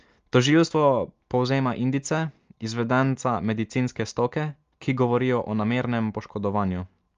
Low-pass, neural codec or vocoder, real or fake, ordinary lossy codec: 7.2 kHz; none; real; Opus, 32 kbps